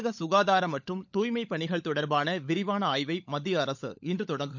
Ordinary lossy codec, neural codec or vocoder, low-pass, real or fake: none; codec, 16 kHz, 16 kbps, FunCodec, trained on LibriTTS, 50 frames a second; 7.2 kHz; fake